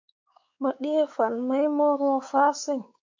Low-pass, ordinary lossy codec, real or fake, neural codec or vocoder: 7.2 kHz; MP3, 48 kbps; fake; codec, 16 kHz, 4 kbps, X-Codec, WavLM features, trained on Multilingual LibriSpeech